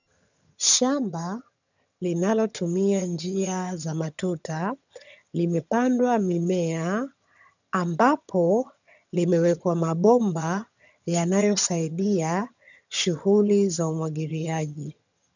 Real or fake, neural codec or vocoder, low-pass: fake; vocoder, 22.05 kHz, 80 mel bands, HiFi-GAN; 7.2 kHz